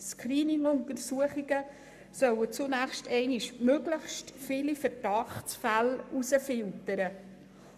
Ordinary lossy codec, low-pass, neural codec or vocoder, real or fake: none; 14.4 kHz; codec, 44.1 kHz, 7.8 kbps, Pupu-Codec; fake